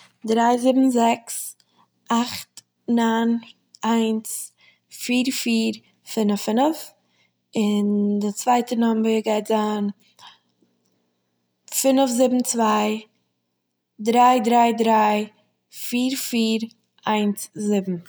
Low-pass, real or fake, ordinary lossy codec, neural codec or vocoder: none; real; none; none